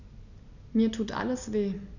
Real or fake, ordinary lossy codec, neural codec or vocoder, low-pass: real; none; none; 7.2 kHz